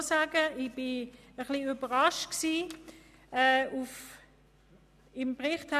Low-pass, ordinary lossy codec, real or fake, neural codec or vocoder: 14.4 kHz; none; real; none